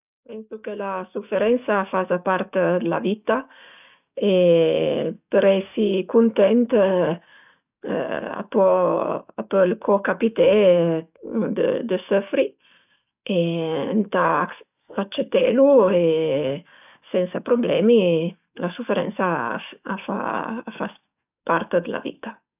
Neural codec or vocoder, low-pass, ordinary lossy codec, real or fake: codec, 44.1 kHz, 7.8 kbps, DAC; 3.6 kHz; none; fake